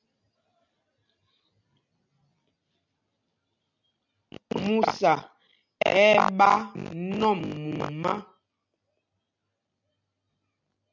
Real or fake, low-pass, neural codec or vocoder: real; 7.2 kHz; none